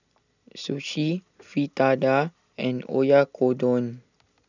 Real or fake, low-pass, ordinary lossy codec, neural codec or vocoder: real; 7.2 kHz; none; none